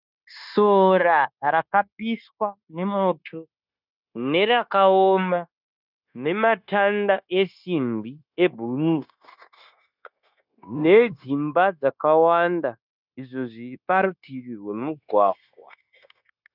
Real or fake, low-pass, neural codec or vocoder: fake; 5.4 kHz; codec, 16 kHz, 0.9 kbps, LongCat-Audio-Codec